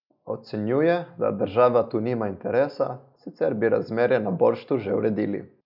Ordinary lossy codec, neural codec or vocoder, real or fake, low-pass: none; none; real; 5.4 kHz